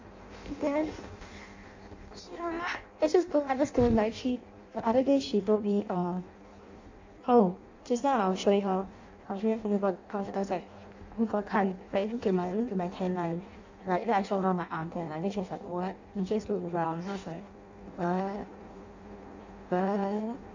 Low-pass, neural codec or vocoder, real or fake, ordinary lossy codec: 7.2 kHz; codec, 16 kHz in and 24 kHz out, 0.6 kbps, FireRedTTS-2 codec; fake; AAC, 48 kbps